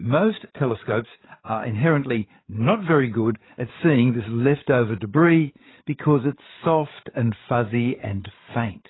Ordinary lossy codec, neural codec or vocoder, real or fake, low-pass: AAC, 16 kbps; codec, 16 kHz, 8 kbps, FreqCodec, larger model; fake; 7.2 kHz